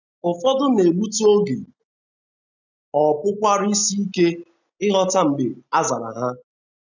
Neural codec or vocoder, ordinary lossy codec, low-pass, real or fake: none; none; 7.2 kHz; real